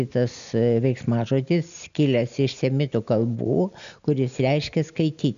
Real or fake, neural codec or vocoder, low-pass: real; none; 7.2 kHz